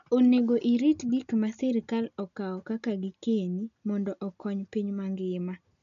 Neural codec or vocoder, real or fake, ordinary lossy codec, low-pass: none; real; none; 7.2 kHz